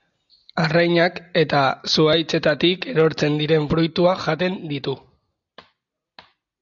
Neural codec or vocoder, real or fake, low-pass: none; real; 7.2 kHz